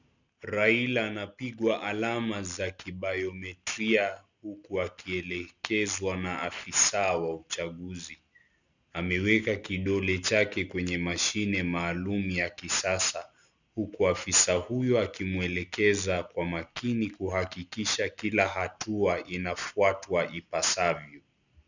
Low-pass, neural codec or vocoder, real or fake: 7.2 kHz; none; real